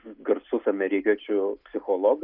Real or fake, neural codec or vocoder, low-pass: real; none; 5.4 kHz